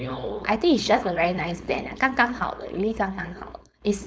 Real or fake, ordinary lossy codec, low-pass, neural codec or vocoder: fake; none; none; codec, 16 kHz, 4.8 kbps, FACodec